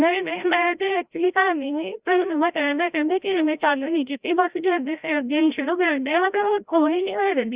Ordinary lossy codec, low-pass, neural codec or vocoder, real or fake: Opus, 64 kbps; 3.6 kHz; codec, 16 kHz, 0.5 kbps, FreqCodec, larger model; fake